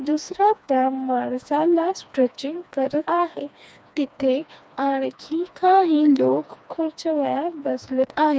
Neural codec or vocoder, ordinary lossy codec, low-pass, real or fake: codec, 16 kHz, 2 kbps, FreqCodec, smaller model; none; none; fake